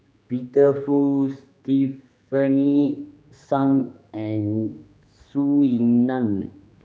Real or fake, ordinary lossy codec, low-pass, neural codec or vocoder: fake; none; none; codec, 16 kHz, 2 kbps, X-Codec, HuBERT features, trained on general audio